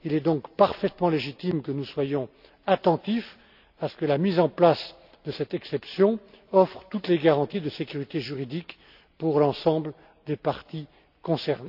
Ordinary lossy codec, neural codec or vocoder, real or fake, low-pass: none; none; real; 5.4 kHz